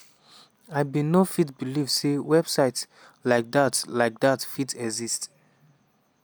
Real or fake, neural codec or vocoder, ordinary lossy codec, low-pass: real; none; none; none